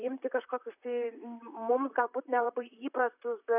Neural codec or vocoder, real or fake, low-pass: vocoder, 44.1 kHz, 128 mel bands, Pupu-Vocoder; fake; 3.6 kHz